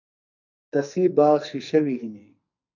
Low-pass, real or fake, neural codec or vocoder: 7.2 kHz; fake; codec, 44.1 kHz, 2.6 kbps, SNAC